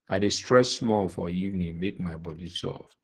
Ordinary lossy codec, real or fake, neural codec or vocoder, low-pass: Opus, 16 kbps; fake; codec, 44.1 kHz, 2.6 kbps, SNAC; 14.4 kHz